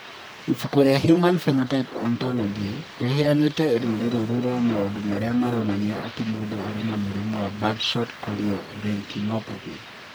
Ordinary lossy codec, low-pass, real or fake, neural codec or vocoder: none; none; fake; codec, 44.1 kHz, 1.7 kbps, Pupu-Codec